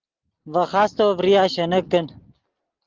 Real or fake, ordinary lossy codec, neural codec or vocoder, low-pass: real; Opus, 32 kbps; none; 7.2 kHz